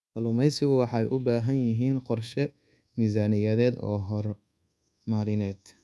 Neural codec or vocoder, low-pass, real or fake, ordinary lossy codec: codec, 24 kHz, 1.2 kbps, DualCodec; none; fake; none